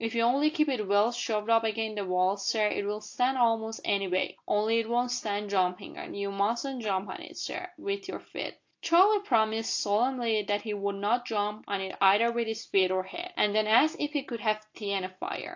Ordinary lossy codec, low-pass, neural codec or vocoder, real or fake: AAC, 48 kbps; 7.2 kHz; none; real